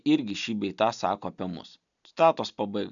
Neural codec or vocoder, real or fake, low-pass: none; real; 7.2 kHz